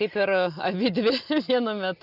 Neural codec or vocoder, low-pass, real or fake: none; 5.4 kHz; real